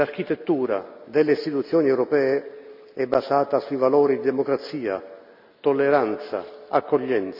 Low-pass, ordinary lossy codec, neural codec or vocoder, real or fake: 5.4 kHz; none; none; real